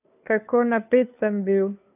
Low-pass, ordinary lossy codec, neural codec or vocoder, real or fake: 3.6 kHz; none; codec, 16 kHz, 2 kbps, FunCodec, trained on Chinese and English, 25 frames a second; fake